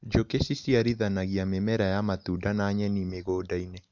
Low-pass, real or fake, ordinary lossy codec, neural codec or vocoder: 7.2 kHz; real; none; none